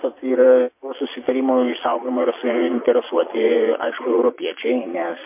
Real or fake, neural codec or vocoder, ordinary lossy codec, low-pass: fake; vocoder, 22.05 kHz, 80 mel bands, Vocos; MP3, 24 kbps; 3.6 kHz